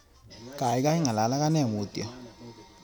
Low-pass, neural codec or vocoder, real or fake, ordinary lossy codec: none; none; real; none